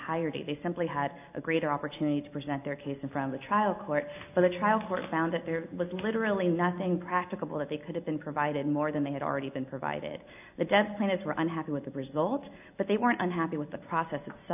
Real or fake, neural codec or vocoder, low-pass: real; none; 3.6 kHz